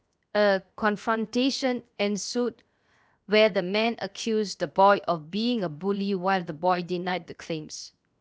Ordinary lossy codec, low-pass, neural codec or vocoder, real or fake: none; none; codec, 16 kHz, 0.7 kbps, FocalCodec; fake